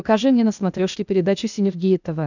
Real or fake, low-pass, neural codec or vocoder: fake; 7.2 kHz; codec, 16 kHz, 0.8 kbps, ZipCodec